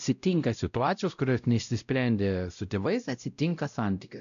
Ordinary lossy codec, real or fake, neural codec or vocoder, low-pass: AAC, 96 kbps; fake; codec, 16 kHz, 0.5 kbps, X-Codec, WavLM features, trained on Multilingual LibriSpeech; 7.2 kHz